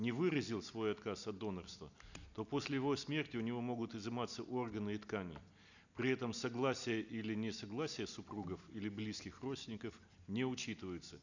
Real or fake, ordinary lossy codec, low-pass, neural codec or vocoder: real; none; 7.2 kHz; none